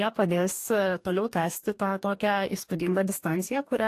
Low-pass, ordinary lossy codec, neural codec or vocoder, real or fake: 14.4 kHz; AAC, 64 kbps; codec, 44.1 kHz, 2.6 kbps, DAC; fake